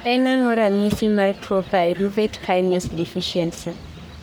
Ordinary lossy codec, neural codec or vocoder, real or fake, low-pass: none; codec, 44.1 kHz, 1.7 kbps, Pupu-Codec; fake; none